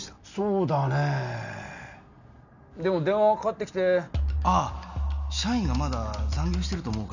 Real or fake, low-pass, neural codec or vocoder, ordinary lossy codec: real; 7.2 kHz; none; none